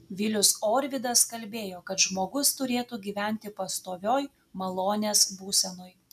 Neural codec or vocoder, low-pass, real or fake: none; 14.4 kHz; real